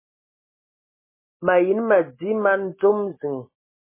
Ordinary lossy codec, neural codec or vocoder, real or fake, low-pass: MP3, 16 kbps; none; real; 3.6 kHz